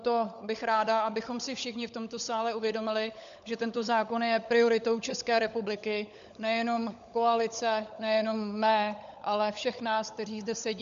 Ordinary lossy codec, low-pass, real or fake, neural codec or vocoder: MP3, 64 kbps; 7.2 kHz; fake; codec, 16 kHz, 16 kbps, FunCodec, trained on LibriTTS, 50 frames a second